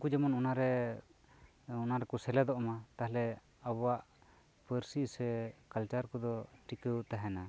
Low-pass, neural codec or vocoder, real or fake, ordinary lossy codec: none; none; real; none